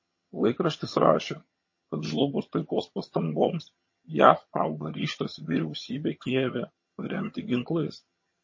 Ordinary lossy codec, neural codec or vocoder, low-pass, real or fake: MP3, 32 kbps; vocoder, 22.05 kHz, 80 mel bands, HiFi-GAN; 7.2 kHz; fake